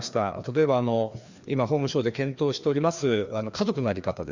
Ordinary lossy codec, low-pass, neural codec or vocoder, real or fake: Opus, 64 kbps; 7.2 kHz; codec, 16 kHz, 2 kbps, FreqCodec, larger model; fake